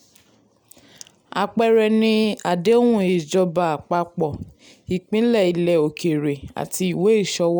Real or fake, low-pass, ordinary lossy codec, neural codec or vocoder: real; none; none; none